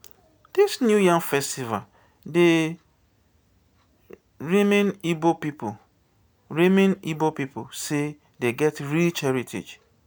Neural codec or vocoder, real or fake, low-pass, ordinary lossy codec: none; real; none; none